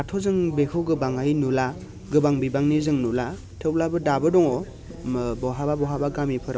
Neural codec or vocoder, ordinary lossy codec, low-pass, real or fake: none; none; none; real